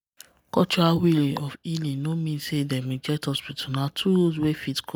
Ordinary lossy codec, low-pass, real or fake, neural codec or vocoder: none; none; real; none